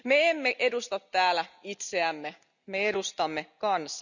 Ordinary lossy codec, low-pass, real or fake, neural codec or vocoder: none; 7.2 kHz; real; none